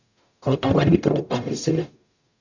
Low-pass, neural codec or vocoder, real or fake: 7.2 kHz; codec, 44.1 kHz, 0.9 kbps, DAC; fake